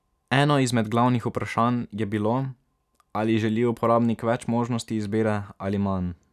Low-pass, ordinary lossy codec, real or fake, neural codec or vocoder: 14.4 kHz; none; real; none